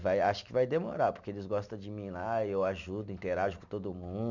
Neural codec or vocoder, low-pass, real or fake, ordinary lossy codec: none; 7.2 kHz; real; none